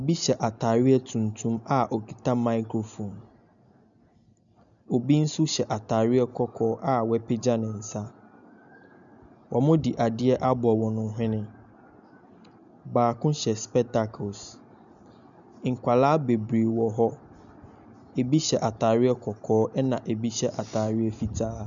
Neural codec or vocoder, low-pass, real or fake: none; 7.2 kHz; real